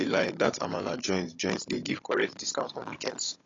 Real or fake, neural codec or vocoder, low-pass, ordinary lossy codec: fake; codec, 16 kHz, 16 kbps, FunCodec, trained on Chinese and English, 50 frames a second; 7.2 kHz; AAC, 32 kbps